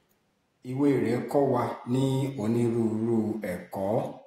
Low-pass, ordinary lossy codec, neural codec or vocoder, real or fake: 19.8 kHz; AAC, 48 kbps; vocoder, 48 kHz, 128 mel bands, Vocos; fake